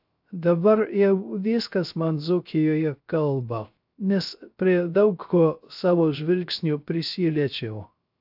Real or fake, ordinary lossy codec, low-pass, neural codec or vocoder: fake; AAC, 48 kbps; 5.4 kHz; codec, 16 kHz, 0.3 kbps, FocalCodec